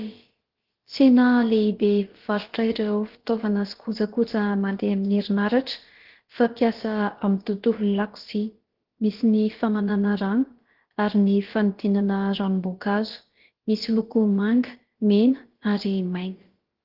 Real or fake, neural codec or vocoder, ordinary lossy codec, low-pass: fake; codec, 16 kHz, about 1 kbps, DyCAST, with the encoder's durations; Opus, 16 kbps; 5.4 kHz